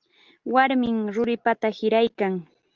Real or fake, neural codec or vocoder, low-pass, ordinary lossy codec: real; none; 7.2 kHz; Opus, 32 kbps